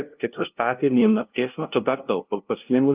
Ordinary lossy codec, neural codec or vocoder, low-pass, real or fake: Opus, 32 kbps; codec, 16 kHz, 0.5 kbps, FunCodec, trained on LibriTTS, 25 frames a second; 3.6 kHz; fake